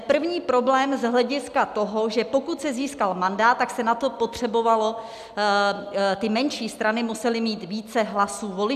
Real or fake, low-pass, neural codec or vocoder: real; 14.4 kHz; none